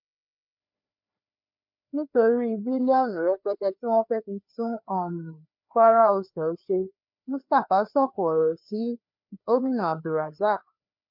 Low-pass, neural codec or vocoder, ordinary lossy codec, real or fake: 5.4 kHz; codec, 16 kHz, 2 kbps, FreqCodec, larger model; MP3, 48 kbps; fake